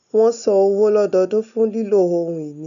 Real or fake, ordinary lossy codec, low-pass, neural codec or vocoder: real; none; 7.2 kHz; none